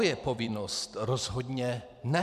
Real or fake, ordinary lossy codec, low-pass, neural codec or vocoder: fake; MP3, 96 kbps; 14.4 kHz; vocoder, 44.1 kHz, 128 mel bands every 256 samples, BigVGAN v2